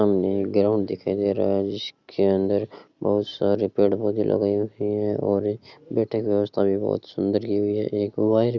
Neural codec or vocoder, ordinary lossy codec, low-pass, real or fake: none; Opus, 64 kbps; 7.2 kHz; real